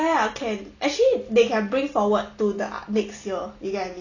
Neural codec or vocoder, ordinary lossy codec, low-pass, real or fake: none; none; 7.2 kHz; real